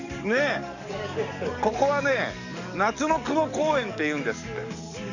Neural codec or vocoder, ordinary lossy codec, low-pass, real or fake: codec, 44.1 kHz, 7.8 kbps, DAC; none; 7.2 kHz; fake